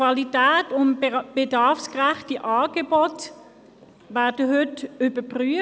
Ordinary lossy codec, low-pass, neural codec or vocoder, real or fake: none; none; none; real